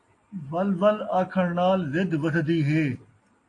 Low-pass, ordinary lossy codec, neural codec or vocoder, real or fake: 10.8 kHz; AAC, 48 kbps; none; real